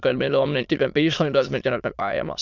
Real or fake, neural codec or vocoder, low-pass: fake; autoencoder, 22.05 kHz, a latent of 192 numbers a frame, VITS, trained on many speakers; 7.2 kHz